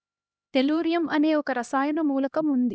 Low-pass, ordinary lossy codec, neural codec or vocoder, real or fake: none; none; codec, 16 kHz, 1 kbps, X-Codec, HuBERT features, trained on LibriSpeech; fake